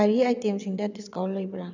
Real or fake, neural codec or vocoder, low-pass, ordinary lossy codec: fake; vocoder, 22.05 kHz, 80 mel bands, WaveNeXt; 7.2 kHz; none